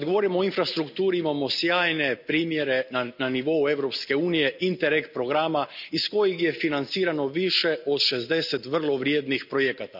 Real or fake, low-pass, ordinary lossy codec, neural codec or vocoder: real; 5.4 kHz; none; none